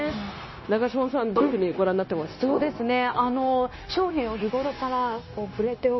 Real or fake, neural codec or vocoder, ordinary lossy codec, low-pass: fake; codec, 16 kHz, 0.9 kbps, LongCat-Audio-Codec; MP3, 24 kbps; 7.2 kHz